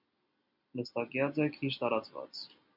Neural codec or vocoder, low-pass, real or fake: none; 5.4 kHz; real